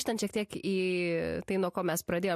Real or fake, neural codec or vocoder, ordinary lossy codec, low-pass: real; none; MP3, 64 kbps; 14.4 kHz